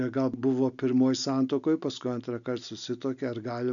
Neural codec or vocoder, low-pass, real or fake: none; 7.2 kHz; real